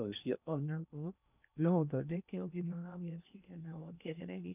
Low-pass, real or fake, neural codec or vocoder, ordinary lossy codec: 3.6 kHz; fake; codec, 16 kHz in and 24 kHz out, 0.6 kbps, FocalCodec, streaming, 2048 codes; none